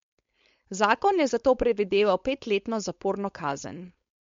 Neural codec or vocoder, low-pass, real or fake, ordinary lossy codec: codec, 16 kHz, 4.8 kbps, FACodec; 7.2 kHz; fake; MP3, 48 kbps